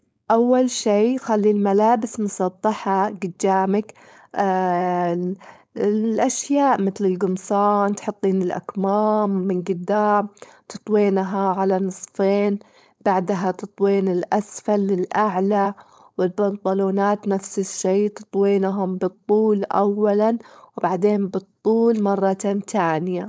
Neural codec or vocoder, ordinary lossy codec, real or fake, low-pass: codec, 16 kHz, 4.8 kbps, FACodec; none; fake; none